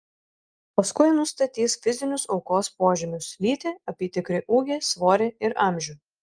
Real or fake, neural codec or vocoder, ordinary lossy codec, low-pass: real; none; Opus, 32 kbps; 9.9 kHz